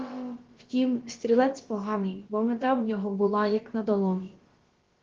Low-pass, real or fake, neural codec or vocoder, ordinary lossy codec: 7.2 kHz; fake; codec, 16 kHz, about 1 kbps, DyCAST, with the encoder's durations; Opus, 16 kbps